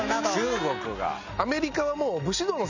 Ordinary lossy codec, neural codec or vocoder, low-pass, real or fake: none; none; 7.2 kHz; real